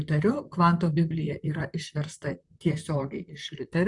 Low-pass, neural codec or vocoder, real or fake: 10.8 kHz; vocoder, 44.1 kHz, 128 mel bands, Pupu-Vocoder; fake